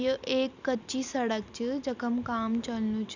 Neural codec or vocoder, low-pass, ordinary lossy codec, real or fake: none; 7.2 kHz; none; real